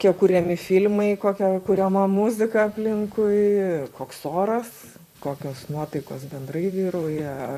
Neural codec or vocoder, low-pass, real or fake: vocoder, 44.1 kHz, 128 mel bands, Pupu-Vocoder; 14.4 kHz; fake